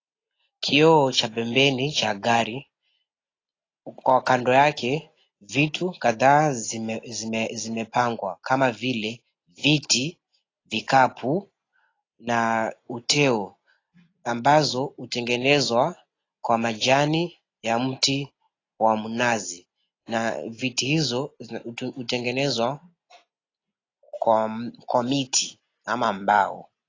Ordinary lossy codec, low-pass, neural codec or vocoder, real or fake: AAC, 32 kbps; 7.2 kHz; none; real